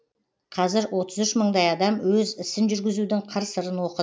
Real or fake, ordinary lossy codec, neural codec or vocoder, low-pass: real; none; none; none